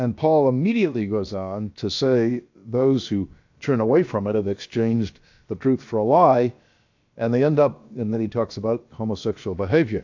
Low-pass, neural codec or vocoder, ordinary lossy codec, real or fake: 7.2 kHz; codec, 16 kHz, about 1 kbps, DyCAST, with the encoder's durations; AAC, 48 kbps; fake